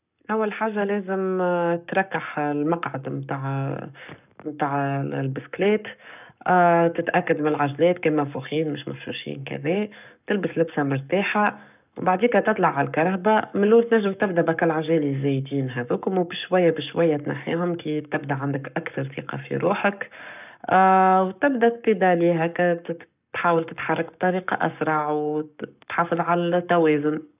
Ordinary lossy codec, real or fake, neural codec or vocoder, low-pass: none; fake; codec, 44.1 kHz, 7.8 kbps, Pupu-Codec; 3.6 kHz